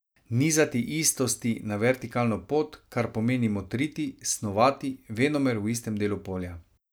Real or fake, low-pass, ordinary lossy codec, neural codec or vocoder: real; none; none; none